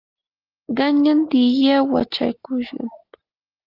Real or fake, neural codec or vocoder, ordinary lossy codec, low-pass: real; none; Opus, 32 kbps; 5.4 kHz